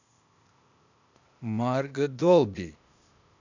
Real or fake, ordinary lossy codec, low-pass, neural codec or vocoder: fake; none; 7.2 kHz; codec, 16 kHz, 0.8 kbps, ZipCodec